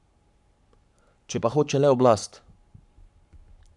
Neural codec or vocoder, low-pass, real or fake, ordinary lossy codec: codec, 44.1 kHz, 7.8 kbps, Pupu-Codec; 10.8 kHz; fake; none